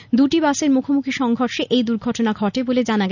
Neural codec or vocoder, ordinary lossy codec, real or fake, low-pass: none; none; real; 7.2 kHz